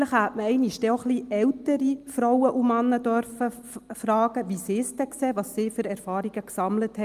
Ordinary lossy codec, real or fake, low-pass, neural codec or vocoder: Opus, 32 kbps; real; 14.4 kHz; none